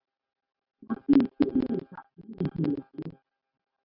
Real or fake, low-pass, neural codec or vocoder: real; 5.4 kHz; none